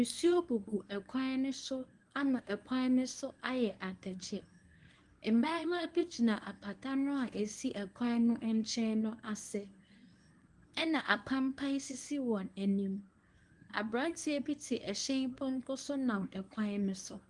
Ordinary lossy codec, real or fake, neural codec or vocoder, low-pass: Opus, 24 kbps; fake; codec, 24 kHz, 0.9 kbps, WavTokenizer, medium speech release version 1; 10.8 kHz